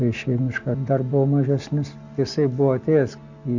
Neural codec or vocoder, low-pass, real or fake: none; 7.2 kHz; real